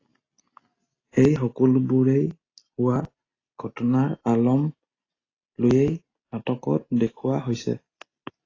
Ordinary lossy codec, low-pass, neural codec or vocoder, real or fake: AAC, 32 kbps; 7.2 kHz; none; real